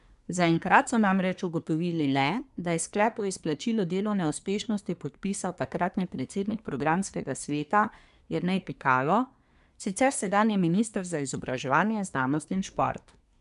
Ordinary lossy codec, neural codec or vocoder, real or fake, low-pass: none; codec, 24 kHz, 1 kbps, SNAC; fake; 10.8 kHz